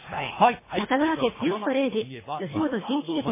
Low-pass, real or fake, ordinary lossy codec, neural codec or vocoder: 3.6 kHz; fake; MP3, 16 kbps; codec, 24 kHz, 3 kbps, HILCodec